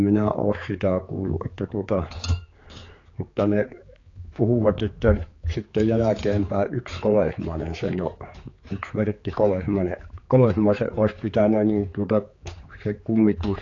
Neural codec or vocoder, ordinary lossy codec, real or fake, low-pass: codec, 16 kHz, 4 kbps, X-Codec, HuBERT features, trained on general audio; AAC, 32 kbps; fake; 7.2 kHz